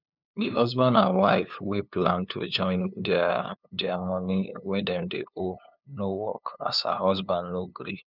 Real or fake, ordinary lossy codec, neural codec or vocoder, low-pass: fake; none; codec, 16 kHz, 2 kbps, FunCodec, trained on LibriTTS, 25 frames a second; 5.4 kHz